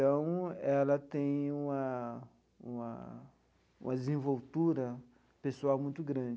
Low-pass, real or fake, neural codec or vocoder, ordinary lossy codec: none; real; none; none